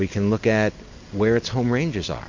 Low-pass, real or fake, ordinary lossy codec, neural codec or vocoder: 7.2 kHz; real; MP3, 48 kbps; none